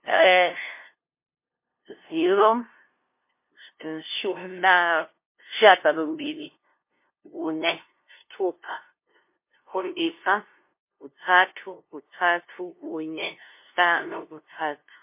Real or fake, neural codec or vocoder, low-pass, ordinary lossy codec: fake; codec, 16 kHz, 0.5 kbps, FunCodec, trained on LibriTTS, 25 frames a second; 3.6 kHz; MP3, 24 kbps